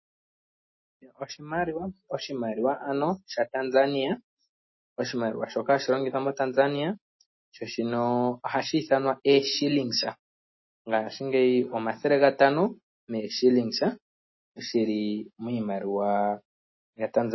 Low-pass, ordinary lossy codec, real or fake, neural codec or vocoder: 7.2 kHz; MP3, 24 kbps; real; none